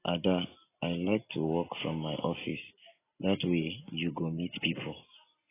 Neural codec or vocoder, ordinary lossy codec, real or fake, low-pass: none; AAC, 16 kbps; real; 3.6 kHz